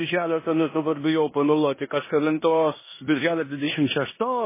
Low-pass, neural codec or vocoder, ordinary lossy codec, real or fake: 3.6 kHz; codec, 16 kHz in and 24 kHz out, 0.9 kbps, LongCat-Audio-Codec, four codebook decoder; MP3, 16 kbps; fake